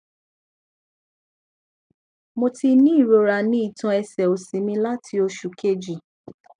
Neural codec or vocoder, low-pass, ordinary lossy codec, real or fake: none; 9.9 kHz; none; real